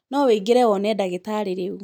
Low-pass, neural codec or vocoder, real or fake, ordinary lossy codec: 19.8 kHz; none; real; none